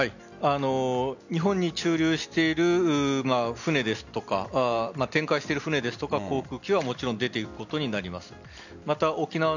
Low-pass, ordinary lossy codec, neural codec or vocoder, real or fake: 7.2 kHz; none; none; real